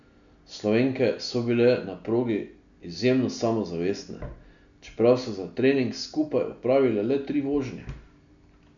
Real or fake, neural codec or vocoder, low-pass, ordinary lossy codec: real; none; 7.2 kHz; none